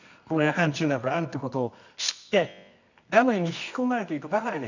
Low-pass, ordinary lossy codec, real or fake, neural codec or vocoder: 7.2 kHz; none; fake; codec, 24 kHz, 0.9 kbps, WavTokenizer, medium music audio release